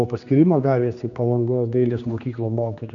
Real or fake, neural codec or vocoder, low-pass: fake; codec, 16 kHz, 2 kbps, X-Codec, HuBERT features, trained on general audio; 7.2 kHz